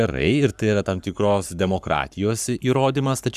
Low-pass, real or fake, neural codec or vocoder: 14.4 kHz; fake; codec, 44.1 kHz, 7.8 kbps, Pupu-Codec